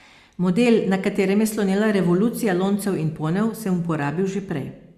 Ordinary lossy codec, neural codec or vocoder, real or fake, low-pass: Opus, 64 kbps; none; real; 14.4 kHz